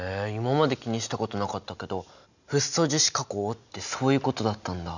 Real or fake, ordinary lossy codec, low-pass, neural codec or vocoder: real; none; 7.2 kHz; none